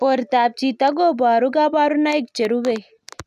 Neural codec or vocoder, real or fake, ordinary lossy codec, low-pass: none; real; none; 14.4 kHz